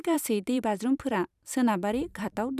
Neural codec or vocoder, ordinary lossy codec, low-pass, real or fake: vocoder, 44.1 kHz, 128 mel bands every 512 samples, BigVGAN v2; none; 14.4 kHz; fake